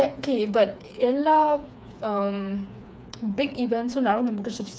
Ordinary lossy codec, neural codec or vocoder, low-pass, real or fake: none; codec, 16 kHz, 4 kbps, FreqCodec, smaller model; none; fake